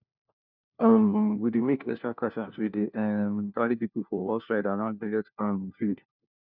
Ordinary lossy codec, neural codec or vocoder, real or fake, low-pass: none; codec, 16 kHz, 1 kbps, FunCodec, trained on LibriTTS, 50 frames a second; fake; 5.4 kHz